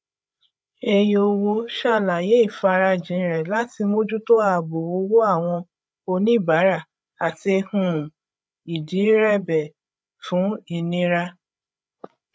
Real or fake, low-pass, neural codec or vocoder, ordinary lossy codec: fake; none; codec, 16 kHz, 8 kbps, FreqCodec, larger model; none